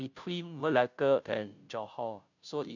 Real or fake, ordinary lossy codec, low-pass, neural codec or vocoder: fake; none; 7.2 kHz; codec, 16 kHz, 0.5 kbps, FunCodec, trained on Chinese and English, 25 frames a second